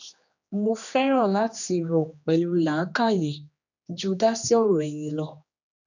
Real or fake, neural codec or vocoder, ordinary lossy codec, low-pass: fake; codec, 16 kHz, 2 kbps, X-Codec, HuBERT features, trained on general audio; none; 7.2 kHz